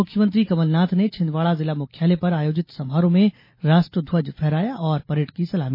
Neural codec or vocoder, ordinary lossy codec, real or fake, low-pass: none; MP3, 24 kbps; real; 5.4 kHz